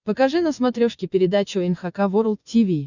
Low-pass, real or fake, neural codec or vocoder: 7.2 kHz; fake; vocoder, 24 kHz, 100 mel bands, Vocos